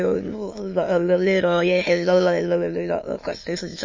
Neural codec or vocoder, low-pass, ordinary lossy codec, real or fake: autoencoder, 22.05 kHz, a latent of 192 numbers a frame, VITS, trained on many speakers; 7.2 kHz; MP3, 32 kbps; fake